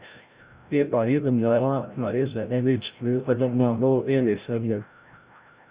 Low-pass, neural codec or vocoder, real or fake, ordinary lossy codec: 3.6 kHz; codec, 16 kHz, 0.5 kbps, FreqCodec, larger model; fake; Opus, 64 kbps